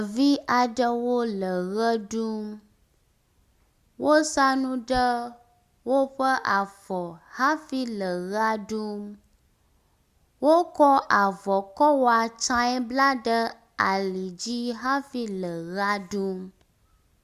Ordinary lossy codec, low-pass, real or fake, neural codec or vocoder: Opus, 64 kbps; 14.4 kHz; real; none